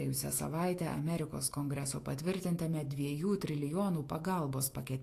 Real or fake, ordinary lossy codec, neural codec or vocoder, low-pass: fake; AAC, 48 kbps; autoencoder, 48 kHz, 128 numbers a frame, DAC-VAE, trained on Japanese speech; 14.4 kHz